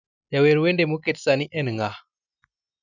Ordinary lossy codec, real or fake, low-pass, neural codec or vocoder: none; real; 7.2 kHz; none